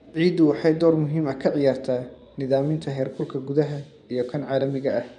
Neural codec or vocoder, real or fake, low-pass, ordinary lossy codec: vocoder, 24 kHz, 100 mel bands, Vocos; fake; 10.8 kHz; none